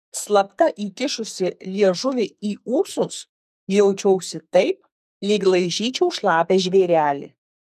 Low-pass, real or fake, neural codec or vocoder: 14.4 kHz; fake; codec, 44.1 kHz, 2.6 kbps, SNAC